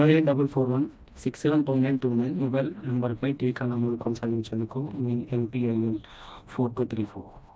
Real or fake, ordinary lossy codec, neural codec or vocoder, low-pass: fake; none; codec, 16 kHz, 1 kbps, FreqCodec, smaller model; none